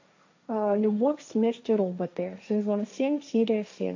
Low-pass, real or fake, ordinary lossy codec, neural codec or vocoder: 7.2 kHz; fake; AAC, 48 kbps; codec, 16 kHz, 1.1 kbps, Voila-Tokenizer